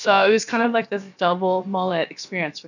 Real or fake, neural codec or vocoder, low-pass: fake; codec, 16 kHz, about 1 kbps, DyCAST, with the encoder's durations; 7.2 kHz